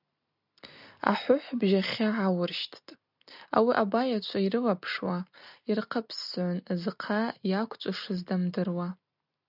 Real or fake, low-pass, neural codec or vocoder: real; 5.4 kHz; none